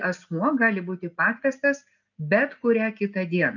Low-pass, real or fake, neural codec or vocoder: 7.2 kHz; real; none